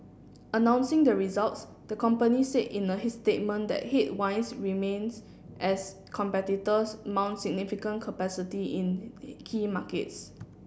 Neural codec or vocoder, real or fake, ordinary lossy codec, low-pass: none; real; none; none